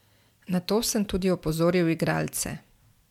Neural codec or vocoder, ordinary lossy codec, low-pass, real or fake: none; MP3, 96 kbps; 19.8 kHz; real